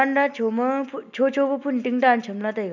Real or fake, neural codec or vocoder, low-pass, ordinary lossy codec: real; none; 7.2 kHz; none